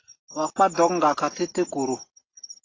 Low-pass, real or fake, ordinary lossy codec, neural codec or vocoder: 7.2 kHz; real; AAC, 32 kbps; none